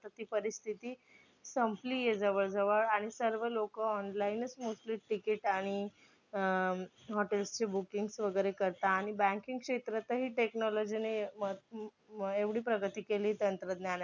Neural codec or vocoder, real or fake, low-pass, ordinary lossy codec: none; real; 7.2 kHz; none